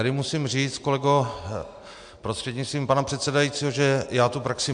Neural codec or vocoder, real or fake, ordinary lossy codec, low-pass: none; real; MP3, 64 kbps; 9.9 kHz